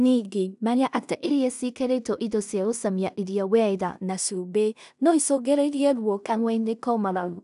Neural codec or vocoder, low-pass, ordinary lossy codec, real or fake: codec, 16 kHz in and 24 kHz out, 0.4 kbps, LongCat-Audio-Codec, two codebook decoder; 10.8 kHz; none; fake